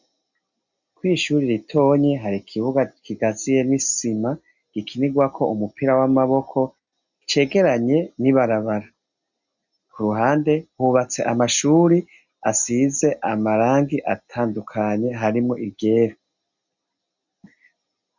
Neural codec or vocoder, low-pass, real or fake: none; 7.2 kHz; real